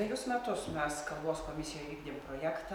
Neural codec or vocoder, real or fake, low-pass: none; real; 19.8 kHz